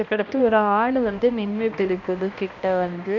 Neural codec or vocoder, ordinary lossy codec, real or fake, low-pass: codec, 16 kHz, 1 kbps, X-Codec, HuBERT features, trained on balanced general audio; AAC, 48 kbps; fake; 7.2 kHz